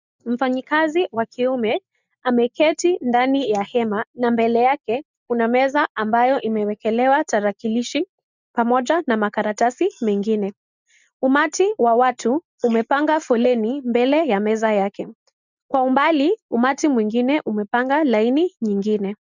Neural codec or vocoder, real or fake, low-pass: none; real; 7.2 kHz